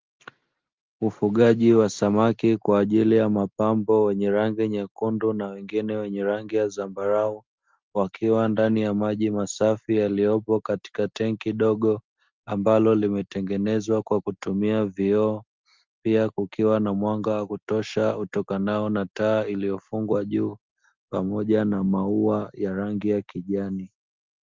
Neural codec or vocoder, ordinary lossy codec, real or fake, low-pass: none; Opus, 32 kbps; real; 7.2 kHz